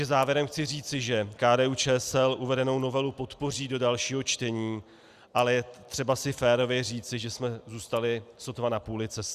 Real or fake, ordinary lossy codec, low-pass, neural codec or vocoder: real; Opus, 64 kbps; 14.4 kHz; none